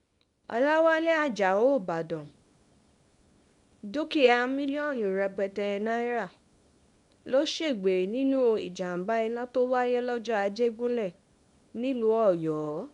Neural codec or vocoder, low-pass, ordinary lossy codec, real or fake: codec, 24 kHz, 0.9 kbps, WavTokenizer, small release; 10.8 kHz; none; fake